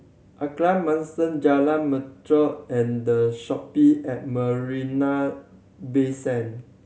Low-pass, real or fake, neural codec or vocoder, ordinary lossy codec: none; real; none; none